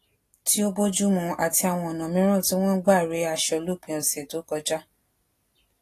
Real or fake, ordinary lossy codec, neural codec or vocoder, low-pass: real; AAC, 48 kbps; none; 14.4 kHz